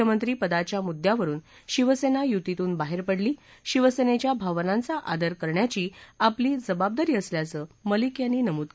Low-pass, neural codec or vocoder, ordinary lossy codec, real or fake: none; none; none; real